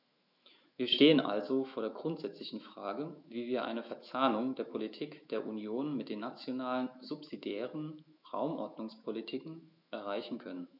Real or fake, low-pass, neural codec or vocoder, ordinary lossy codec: fake; 5.4 kHz; autoencoder, 48 kHz, 128 numbers a frame, DAC-VAE, trained on Japanese speech; none